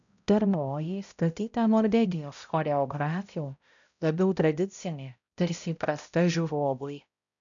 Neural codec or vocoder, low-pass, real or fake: codec, 16 kHz, 0.5 kbps, X-Codec, HuBERT features, trained on balanced general audio; 7.2 kHz; fake